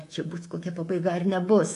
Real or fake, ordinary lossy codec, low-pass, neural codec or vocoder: fake; AAC, 48 kbps; 10.8 kHz; codec, 24 kHz, 3.1 kbps, DualCodec